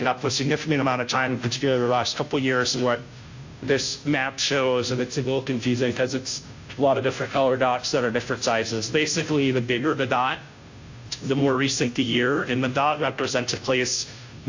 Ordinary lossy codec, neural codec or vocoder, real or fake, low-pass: AAC, 48 kbps; codec, 16 kHz, 0.5 kbps, FunCodec, trained on Chinese and English, 25 frames a second; fake; 7.2 kHz